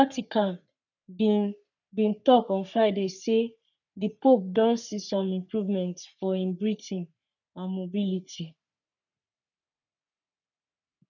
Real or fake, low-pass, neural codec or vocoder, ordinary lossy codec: fake; 7.2 kHz; codec, 44.1 kHz, 3.4 kbps, Pupu-Codec; none